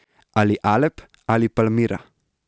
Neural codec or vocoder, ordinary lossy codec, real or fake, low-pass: none; none; real; none